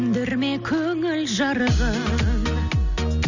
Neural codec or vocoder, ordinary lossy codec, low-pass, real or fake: none; none; 7.2 kHz; real